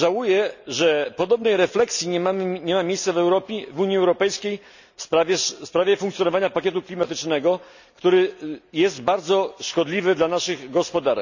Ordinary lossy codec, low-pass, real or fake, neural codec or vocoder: none; 7.2 kHz; real; none